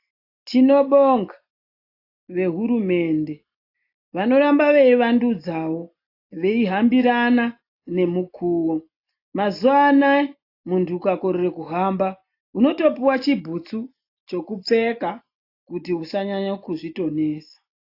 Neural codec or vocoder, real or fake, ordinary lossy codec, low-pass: none; real; AAC, 32 kbps; 5.4 kHz